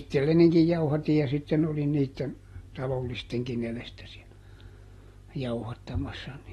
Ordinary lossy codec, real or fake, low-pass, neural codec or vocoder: AAC, 32 kbps; real; 19.8 kHz; none